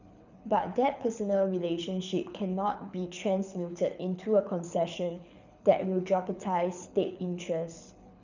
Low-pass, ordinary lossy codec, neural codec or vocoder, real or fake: 7.2 kHz; none; codec, 24 kHz, 6 kbps, HILCodec; fake